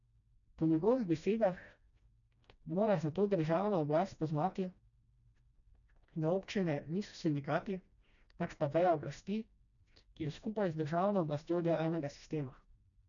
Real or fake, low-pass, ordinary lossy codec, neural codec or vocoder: fake; 7.2 kHz; none; codec, 16 kHz, 1 kbps, FreqCodec, smaller model